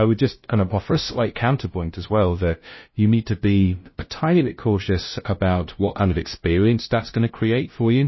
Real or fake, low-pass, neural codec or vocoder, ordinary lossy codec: fake; 7.2 kHz; codec, 16 kHz, 0.5 kbps, FunCodec, trained on LibriTTS, 25 frames a second; MP3, 24 kbps